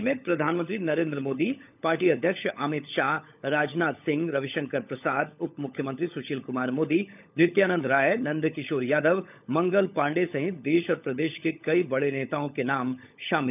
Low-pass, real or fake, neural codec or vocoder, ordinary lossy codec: 3.6 kHz; fake; codec, 16 kHz, 16 kbps, FunCodec, trained on LibriTTS, 50 frames a second; none